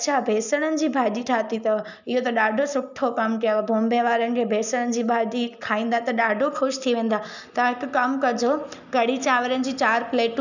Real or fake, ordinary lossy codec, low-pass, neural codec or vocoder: real; none; 7.2 kHz; none